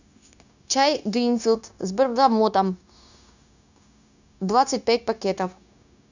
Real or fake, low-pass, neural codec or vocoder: fake; 7.2 kHz; codec, 16 kHz, 0.9 kbps, LongCat-Audio-Codec